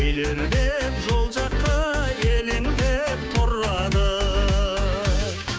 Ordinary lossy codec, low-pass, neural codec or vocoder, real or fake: none; none; codec, 16 kHz, 6 kbps, DAC; fake